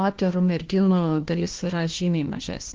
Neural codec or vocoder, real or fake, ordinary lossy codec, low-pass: codec, 16 kHz, 1 kbps, FunCodec, trained on LibriTTS, 50 frames a second; fake; Opus, 16 kbps; 7.2 kHz